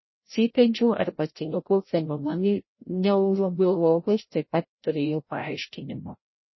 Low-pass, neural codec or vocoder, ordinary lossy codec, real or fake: 7.2 kHz; codec, 16 kHz, 0.5 kbps, FreqCodec, larger model; MP3, 24 kbps; fake